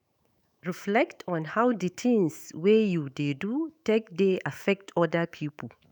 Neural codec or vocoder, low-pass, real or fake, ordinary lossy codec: autoencoder, 48 kHz, 128 numbers a frame, DAC-VAE, trained on Japanese speech; none; fake; none